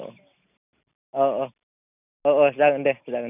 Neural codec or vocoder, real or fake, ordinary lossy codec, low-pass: none; real; none; 3.6 kHz